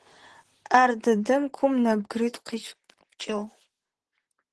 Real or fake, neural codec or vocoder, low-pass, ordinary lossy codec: fake; vocoder, 44.1 kHz, 128 mel bands, Pupu-Vocoder; 10.8 kHz; Opus, 16 kbps